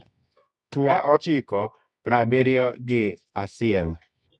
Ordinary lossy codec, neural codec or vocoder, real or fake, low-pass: none; codec, 24 kHz, 0.9 kbps, WavTokenizer, medium music audio release; fake; none